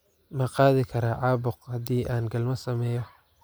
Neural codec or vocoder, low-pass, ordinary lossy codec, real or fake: none; none; none; real